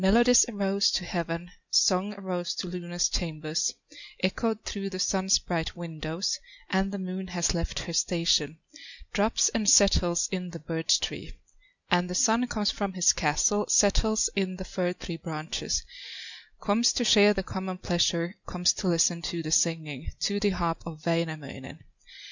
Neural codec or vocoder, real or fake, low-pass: none; real; 7.2 kHz